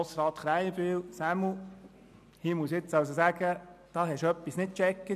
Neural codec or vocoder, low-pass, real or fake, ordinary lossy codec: none; 14.4 kHz; real; none